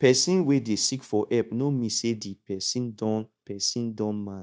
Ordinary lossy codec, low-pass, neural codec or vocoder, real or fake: none; none; codec, 16 kHz, 0.9 kbps, LongCat-Audio-Codec; fake